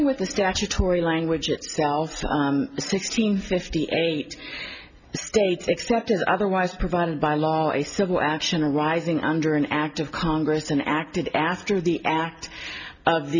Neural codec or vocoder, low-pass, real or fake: none; 7.2 kHz; real